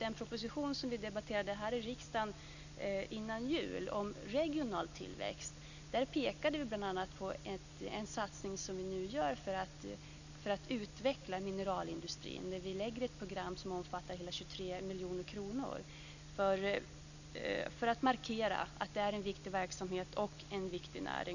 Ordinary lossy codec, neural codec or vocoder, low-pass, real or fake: none; none; 7.2 kHz; real